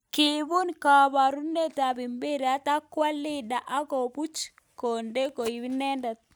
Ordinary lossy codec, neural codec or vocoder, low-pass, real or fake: none; none; none; real